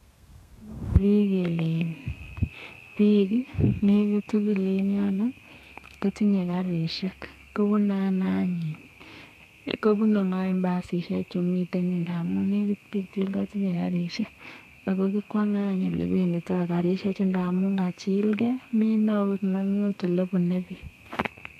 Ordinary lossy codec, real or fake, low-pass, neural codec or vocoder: none; fake; 14.4 kHz; codec, 32 kHz, 1.9 kbps, SNAC